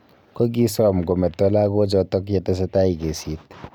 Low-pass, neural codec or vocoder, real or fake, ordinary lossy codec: 19.8 kHz; none; real; none